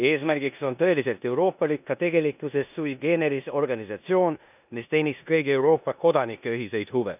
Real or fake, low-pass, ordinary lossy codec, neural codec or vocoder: fake; 3.6 kHz; none; codec, 16 kHz in and 24 kHz out, 0.9 kbps, LongCat-Audio-Codec, four codebook decoder